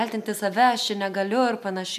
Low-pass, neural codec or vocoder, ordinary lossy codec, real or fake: 14.4 kHz; none; MP3, 96 kbps; real